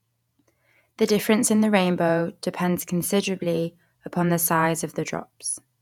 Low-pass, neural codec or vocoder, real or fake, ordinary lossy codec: 19.8 kHz; vocoder, 48 kHz, 128 mel bands, Vocos; fake; none